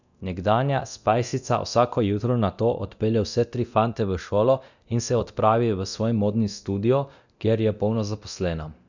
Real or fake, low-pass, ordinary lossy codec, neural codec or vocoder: fake; 7.2 kHz; none; codec, 24 kHz, 0.9 kbps, DualCodec